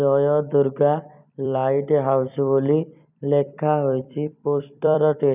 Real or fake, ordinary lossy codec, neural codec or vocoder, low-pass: fake; none; vocoder, 44.1 kHz, 128 mel bands every 256 samples, BigVGAN v2; 3.6 kHz